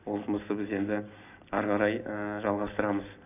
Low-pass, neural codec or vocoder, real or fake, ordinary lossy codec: 3.6 kHz; none; real; none